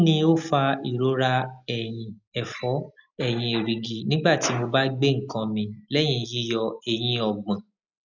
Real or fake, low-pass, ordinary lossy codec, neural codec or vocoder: real; 7.2 kHz; none; none